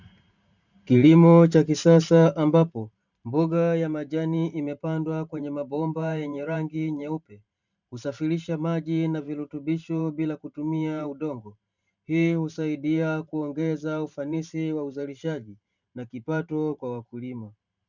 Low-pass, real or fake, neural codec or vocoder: 7.2 kHz; fake; vocoder, 44.1 kHz, 128 mel bands every 512 samples, BigVGAN v2